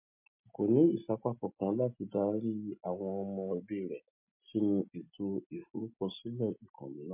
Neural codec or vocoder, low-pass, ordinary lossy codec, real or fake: none; 3.6 kHz; MP3, 24 kbps; real